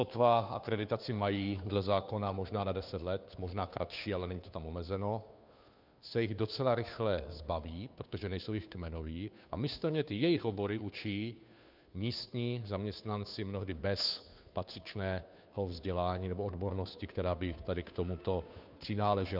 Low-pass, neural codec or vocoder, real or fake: 5.4 kHz; codec, 16 kHz, 2 kbps, FunCodec, trained on Chinese and English, 25 frames a second; fake